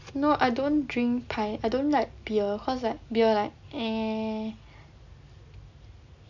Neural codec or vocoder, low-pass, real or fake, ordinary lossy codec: none; 7.2 kHz; real; none